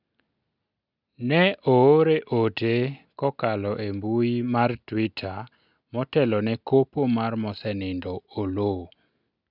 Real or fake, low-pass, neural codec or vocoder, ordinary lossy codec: real; 5.4 kHz; none; none